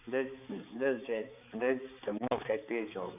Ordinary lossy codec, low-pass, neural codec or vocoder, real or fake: none; 3.6 kHz; codec, 16 kHz, 4 kbps, X-Codec, HuBERT features, trained on balanced general audio; fake